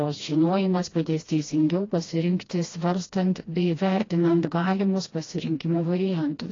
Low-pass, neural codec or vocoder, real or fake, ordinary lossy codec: 7.2 kHz; codec, 16 kHz, 1 kbps, FreqCodec, smaller model; fake; AAC, 32 kbps